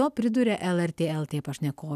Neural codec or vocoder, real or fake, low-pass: none; real; 14.4 kHz